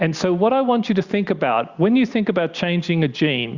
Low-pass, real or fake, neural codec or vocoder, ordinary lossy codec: 7.2 kHz; real; none; Opus, 64 kbps